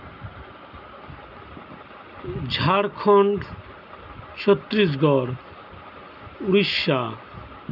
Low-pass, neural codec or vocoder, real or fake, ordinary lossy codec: 5.4 kHz; none; real; none